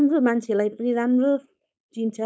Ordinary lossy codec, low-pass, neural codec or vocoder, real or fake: none; none; codec, 16 kHz, 4.8 kbps, FACodec; fake